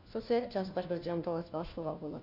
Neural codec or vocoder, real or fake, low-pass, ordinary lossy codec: codec, 16 kHz, 1 kbps, FunCodec, trained on LibriTTS, 50 frames a second; fake; 5.4 kHz; none